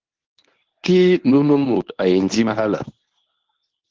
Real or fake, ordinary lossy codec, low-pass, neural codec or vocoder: fake; Opus, 16 kbps; 7.2 kHz; codec, 24 kHz, 0.9 kbps, WavTokenizer, medium speech release version 1